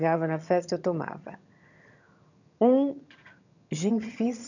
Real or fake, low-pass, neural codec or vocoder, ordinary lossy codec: fake; 7.2 kHz; vocoder, 22.05 kHz, 80 mel bands, HiFi-GAN; none